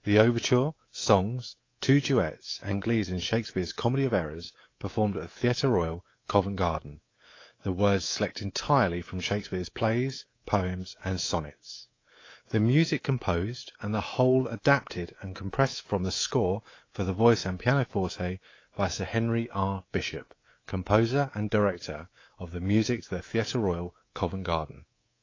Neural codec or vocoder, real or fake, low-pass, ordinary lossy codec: codec, 24 kHz, 3.1 kbps, DualCodec; fake; 7.2 kHz; AAC, 32 kbps